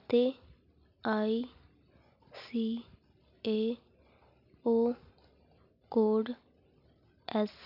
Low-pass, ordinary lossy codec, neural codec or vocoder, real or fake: 5.4 kHz; none; none; real